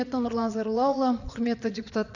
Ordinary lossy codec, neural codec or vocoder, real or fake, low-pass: none; vocoder, 22.05 kHz, 80 mel bands, Vocos; fake; 7.2 kHz